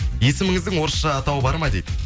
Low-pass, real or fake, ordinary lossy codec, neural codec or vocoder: none; real; none; none